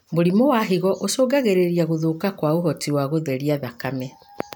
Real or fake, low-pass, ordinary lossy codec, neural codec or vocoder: real; none; none; none